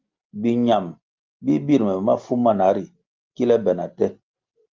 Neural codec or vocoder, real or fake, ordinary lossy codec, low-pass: none; real; Opus, 32 kbps; 7.2 kHz